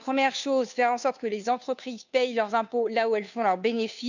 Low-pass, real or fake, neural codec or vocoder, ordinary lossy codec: 7.2 kHz; fake; codec, 16 kHz, 2 kbps, FunCodec, trained on Chinese and English, 25 frames a second; none